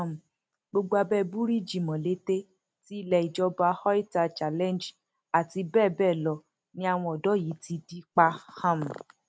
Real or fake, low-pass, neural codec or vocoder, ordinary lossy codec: real; none; none; none